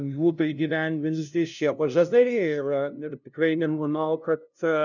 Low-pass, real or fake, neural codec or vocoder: 7.2 kHz; fake; codec, 16 kHz, 0.5 kbps, FunCodec, trained on LibriTTS, 25 frames a second